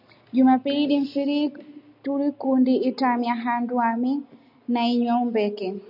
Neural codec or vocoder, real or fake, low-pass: none; real; 5.4 kHz